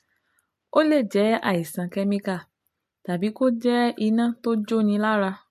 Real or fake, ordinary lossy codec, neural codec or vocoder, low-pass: real; MP3, 64 kbps; none; 14.4 kHz